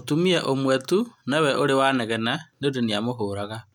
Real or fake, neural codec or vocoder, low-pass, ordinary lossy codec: real; none; 19.8 kHz; none